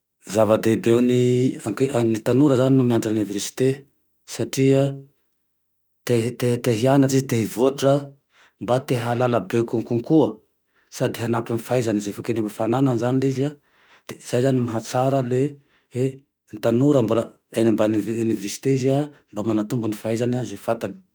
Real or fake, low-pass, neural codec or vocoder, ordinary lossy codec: fake; none; autoencoder, 48 kHz, 32 numbers a frame, DAC-VAE, trained on Japanese speech; none